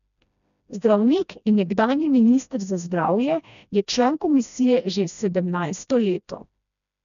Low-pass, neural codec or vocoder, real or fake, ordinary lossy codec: 7.2 kHz; codec, 16 kHz, 1 kbps, FreqCodec, smaller model; fake; none